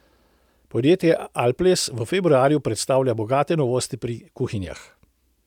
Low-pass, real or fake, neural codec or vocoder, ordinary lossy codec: 19.8 kHz; real; none; none